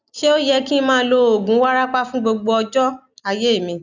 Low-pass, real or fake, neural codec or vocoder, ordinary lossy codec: 7.2 kHz; real; none; none